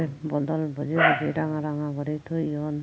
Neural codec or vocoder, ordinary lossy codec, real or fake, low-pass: none; none; real; none